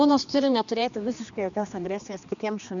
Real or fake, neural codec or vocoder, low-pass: fake; codec, 16 kHz, 2 kbps, X-Codec, HuBERT features, trained on general audio; 7.2 kHz